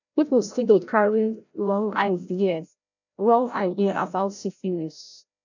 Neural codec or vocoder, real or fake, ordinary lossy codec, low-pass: codec, 16 kHz, 0.5 kbps, FreqCodec, larger model; fake; none; 7.2 kHz